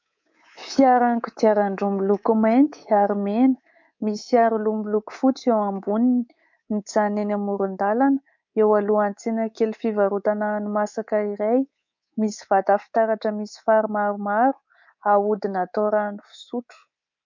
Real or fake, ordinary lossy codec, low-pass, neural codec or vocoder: fake; MP3, 48 kbps; 7.2 kHz; codec, 24 kHz, 3.1 kbps, DualCodec